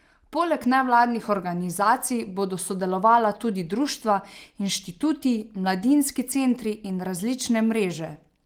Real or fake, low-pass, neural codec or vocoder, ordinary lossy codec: real; 19.8 kHz; none; Opus, 24 kbps